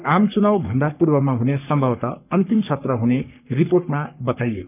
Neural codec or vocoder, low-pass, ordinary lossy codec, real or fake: codec, 44.1 kHz, 3.4 kbps, Pupu-Codec; 3.6 kHz; none; fake